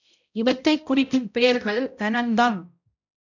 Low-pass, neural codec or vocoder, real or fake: 7.2 kHz; codec, 16 kHz, 0.5 kbps, X-Codec, HuBERT features, trained on balanced general audio; fake